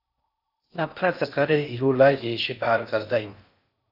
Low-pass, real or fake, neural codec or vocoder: 5.4 kHz; fake; codec, 16 kHz in and 24 kHz out, 0.6 kbps, FocalCodec, streaming, 4096 codes